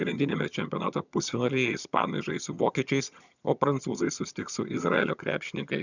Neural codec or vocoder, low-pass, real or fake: vocoder, 22.05 kHz, 80 mel bands, HiFi-GAN; 7.2 kHz; fake